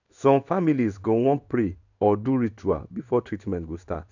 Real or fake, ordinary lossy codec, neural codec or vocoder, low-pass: fake; none; codec, 16 kHz in and 24 kHz out, 1 kbps, XY-Tokenizer; 7.2 kHz